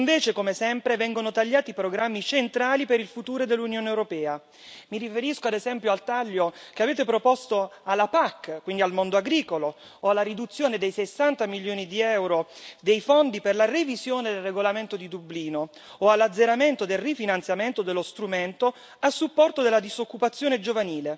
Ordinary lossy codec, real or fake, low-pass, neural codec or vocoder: none; real; none; none